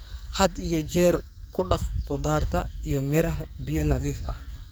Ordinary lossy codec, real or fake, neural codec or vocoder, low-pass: none; fake; codec, 44.1 kHz, 2.6 kbps, SNAC; none